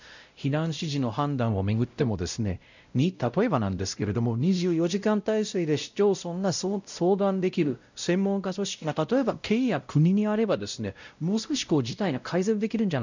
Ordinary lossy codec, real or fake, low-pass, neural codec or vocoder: none; fake; 7.2 kHz; codec, 16 kHz, 0.5 kbps, X-Codec, WavLM features, trained on Multilingual LibriSpeech